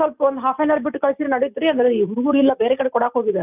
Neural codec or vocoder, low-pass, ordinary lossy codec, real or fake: none; 3.6 kHz; none; real